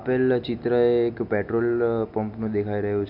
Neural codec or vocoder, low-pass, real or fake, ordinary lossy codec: none; 5.4 kHz; real; none